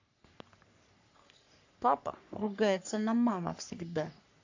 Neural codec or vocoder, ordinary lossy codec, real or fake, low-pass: codec, 44.1 kHz, 3.4 kbps, Pupu-Codec; AAC, 48 kbps; fake; 7.2 kHz